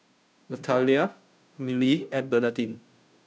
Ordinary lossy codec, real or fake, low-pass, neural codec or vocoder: none; fake; none; codec, 16 kHz, 0.5 kbps, FunCodec, trained on Chinese and English, 25 frames a second